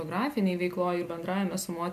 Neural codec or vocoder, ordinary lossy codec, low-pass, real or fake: none; MP3, 96 kbps; 14.4 kHz; real